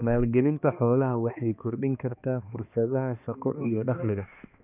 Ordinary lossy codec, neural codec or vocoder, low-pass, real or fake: none; codec, 16 kHz, 2 kbps, X-Codec, HuBERT features, trained on balanced general audio; 3.6 kHz; fake